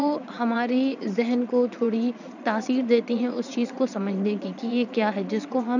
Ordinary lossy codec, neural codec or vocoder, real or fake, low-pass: none; vocoder, 22.05 kHz, 80 mel bands, Vocos; fake; 7.2 kHz